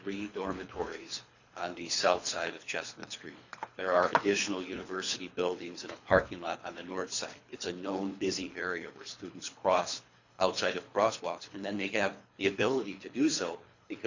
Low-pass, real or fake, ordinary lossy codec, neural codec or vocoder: 7.2 kHz; fake; Opus, 64 kbps; codec, 24 kHz, 3 kbps, HILCodec